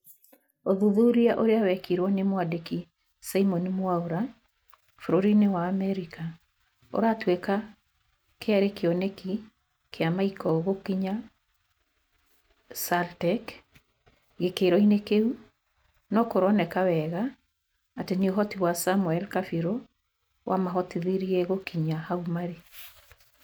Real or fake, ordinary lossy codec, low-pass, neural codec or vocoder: real; none; none; none